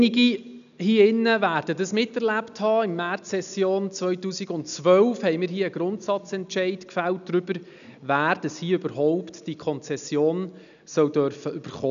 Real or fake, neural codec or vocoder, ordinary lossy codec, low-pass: real; none; none; 7.2 kHz